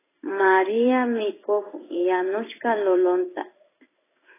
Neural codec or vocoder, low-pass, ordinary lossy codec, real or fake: codec, 16 kHz in and 24 kHz out, 1 kbps, XY-Tokenizer; 3.6 kHz; AAC, 16 kbps; fake